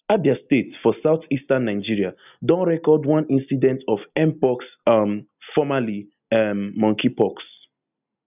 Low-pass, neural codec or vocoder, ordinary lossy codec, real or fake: 3.6 kHz; none; none; real